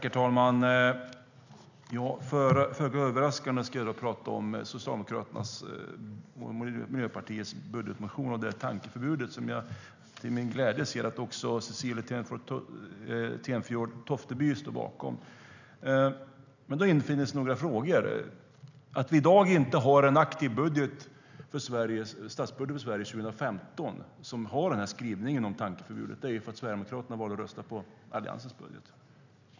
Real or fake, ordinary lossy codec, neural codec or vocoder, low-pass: real; none; none; 7.2 kHz